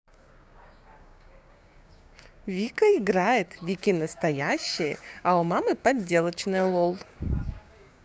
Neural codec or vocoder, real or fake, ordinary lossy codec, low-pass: codec, 16 kHz, 6 kbps, DAC; fake; none; none